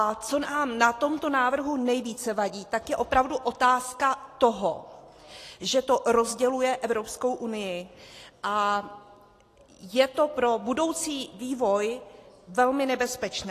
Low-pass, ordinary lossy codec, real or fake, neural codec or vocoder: 14.4 kHz; AAC, 48 kbps; real; none